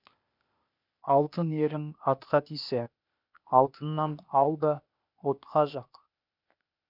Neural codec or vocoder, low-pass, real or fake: codec, 16 kHz, 0.8 kbps, ZipCodec; 5.4 kHz; fake